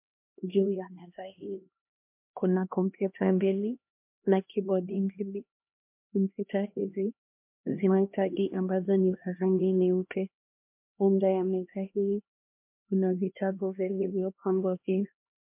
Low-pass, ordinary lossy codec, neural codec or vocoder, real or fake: 3.6 kHz; MP3, 32 kbps; codec, 16 kHz, 1 kbps, X-Codec, HuBERT features, trained on LibriSpeech; fake